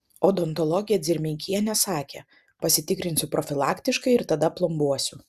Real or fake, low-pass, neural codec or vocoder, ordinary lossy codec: real; 14.4 kHz; none; Opus, 64 kbps